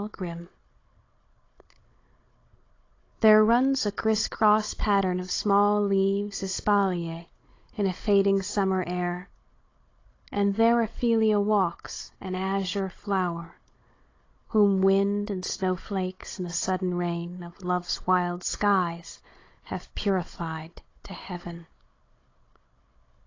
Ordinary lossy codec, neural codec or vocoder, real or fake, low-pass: AAC, 32 kbps; codec, 16 kHz, 16 kbps, FunCodec, trained on Chinese and English, 50 frames a second; fake; 7.2 kHz